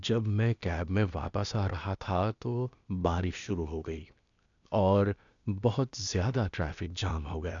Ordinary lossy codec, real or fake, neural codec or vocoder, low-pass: none; fake; codec, 16 kHz, 0.8 kbps, ZipCodec; 7.2 kHz